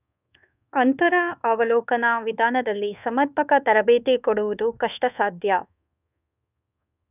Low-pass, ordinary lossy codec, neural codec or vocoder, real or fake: 3.6 kHz; none; codec, 24 kHz, 1.2 kbps, DualCodec; fake